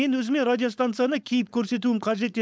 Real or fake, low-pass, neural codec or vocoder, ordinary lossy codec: fake; none; codec, 16 kHz, 4.8 kbps, FACodec; none